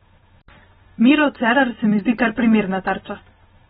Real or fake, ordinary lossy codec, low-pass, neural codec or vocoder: real; AAC, 16 kbps; 14.4 kHz; none